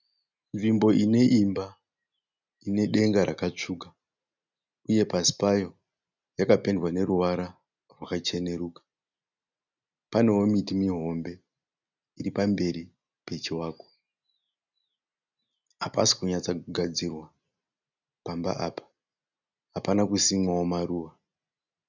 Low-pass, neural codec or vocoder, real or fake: 7.2 kHz; none; real